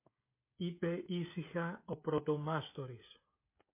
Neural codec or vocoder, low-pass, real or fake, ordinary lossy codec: codec, 16 kHz, 16 kbps, FreqCodec, smaller model; 3.6 kHz; fake; MP3, 24 kbps